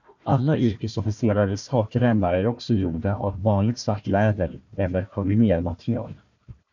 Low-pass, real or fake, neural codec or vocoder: 7.2 kHz; fake; codec, 16 kHz, 1 kbps, FunCodec, trained on Chinese and English, 50 frames a second